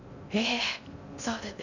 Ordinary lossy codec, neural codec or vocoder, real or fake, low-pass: none; codec, 16 kHz in and 24 kHz out, 0.6 kbps, FocalCodec, streaming, 4096 codes; fake; 7.2 kHz